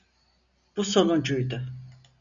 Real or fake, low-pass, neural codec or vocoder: real; 7.2 kHz; none